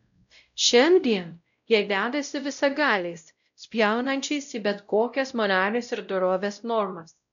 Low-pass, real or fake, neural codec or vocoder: 7.2 kHz; fake; codec, 16 kHz, 0.5 kbps, X-Codec, WavLM features, trained on Multilingual LibriSpeech